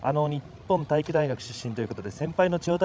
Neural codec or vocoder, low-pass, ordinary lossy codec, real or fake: codec, 16 kHz, 16 kbps, FreqCodec, larger model; none; none; fake